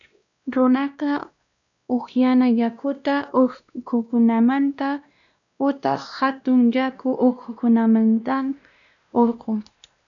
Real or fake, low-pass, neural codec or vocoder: fake; 7.2 kHz; codec, 16 kHz, 1 kbps, X-Codec, WavLM features, trained on Multilingual LibriSpeech